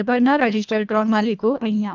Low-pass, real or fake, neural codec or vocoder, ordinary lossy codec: 7.2 kHz; fake; codec, 24 kHz, 1.5 kbps, HILCodec; none